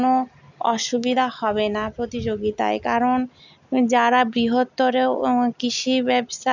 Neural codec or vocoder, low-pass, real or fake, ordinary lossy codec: none; 7.2 kHz; real; none